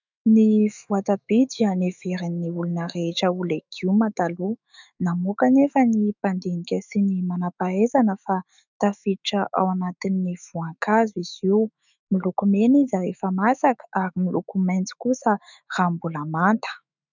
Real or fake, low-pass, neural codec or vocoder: fake; 7.2 kHz; autoencoder, 48 kHz, 128 numbers a frame, DAC-VAE, trained on Japanese speech